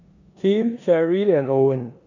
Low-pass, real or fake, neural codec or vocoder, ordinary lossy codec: 7.2 kHz; fake; codec, 16 kHz in and 24 kHz out, 0.9 kbps, LongCat-Audio-Codec, four codebook decoder; none